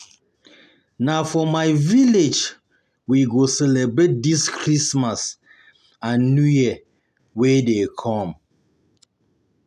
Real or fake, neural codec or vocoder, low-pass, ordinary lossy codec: real; none; 14.4 kHz; none